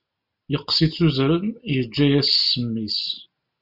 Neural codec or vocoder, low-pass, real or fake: none; 5.4 kHz; real